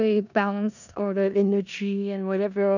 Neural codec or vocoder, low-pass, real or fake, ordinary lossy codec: codec, 16 kHz in and 24 kHz out, 0.4 kbps, LongCat-Audio-Codec, four codebook decoder; 7.2 kHz; fake; none